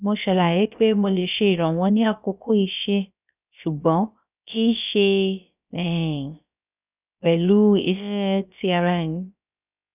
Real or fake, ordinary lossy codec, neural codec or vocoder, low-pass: fake; none; codec, 16 kHz, about 1 kbps, DyCAST, with the encoder's durations; 3.6 kHz